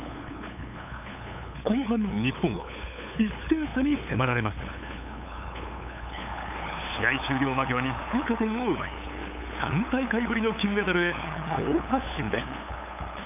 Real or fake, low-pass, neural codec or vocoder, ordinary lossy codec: fake; 3.6 kHz; codec, 16 kHz, 8 kbps, FunCodec, trained on LibriTTS, 25 frames a second; none